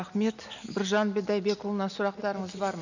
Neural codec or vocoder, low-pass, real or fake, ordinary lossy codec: none; 7.2 kHz; real; none